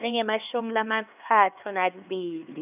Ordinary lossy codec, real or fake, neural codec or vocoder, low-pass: none; fake; codec, 16 kHz, 2 kbps, X-Codec, HuBERT features, trained on LibriSpeech; 3.6 kHz